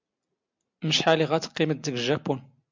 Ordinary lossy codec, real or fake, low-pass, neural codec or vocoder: MP3, 64 kbps; real; 7.2 kHz; none